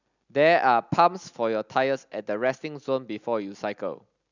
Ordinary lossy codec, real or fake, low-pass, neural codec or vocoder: none; real; 7.2 kHz; none